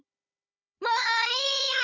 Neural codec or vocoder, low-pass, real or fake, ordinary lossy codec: codec, 16 kHz, 16 kbps, FunCodec, trained on Chinese and English, 50 frames a second; 7.2 kHz; fake; none